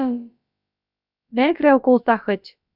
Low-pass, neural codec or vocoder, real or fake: 5.4 kHz; codec, 16 kHz, about 1 kbps, DyCAST, with the encoder's durations; fake